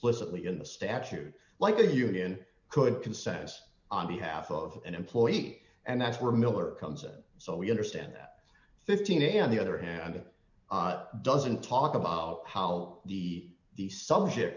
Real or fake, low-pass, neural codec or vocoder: real; 7.2 kHz; none